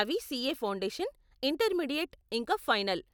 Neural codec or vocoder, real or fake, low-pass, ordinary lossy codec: none; real; none; none